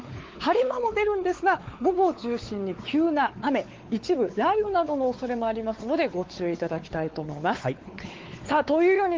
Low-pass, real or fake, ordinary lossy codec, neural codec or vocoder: 7.2 kHz; fake; Opus, 16 kbps; codec, 16 kHz, 4 kbps, X-Codec, WavLM features, trained on Multilingual LibriSpeech